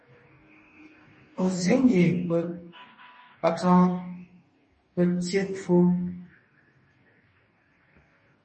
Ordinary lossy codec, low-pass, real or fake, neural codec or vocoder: MP3, 32 kbps; 10.8 kHz; fake; codec, 44.1 kHz, 2.6 kbps, DAC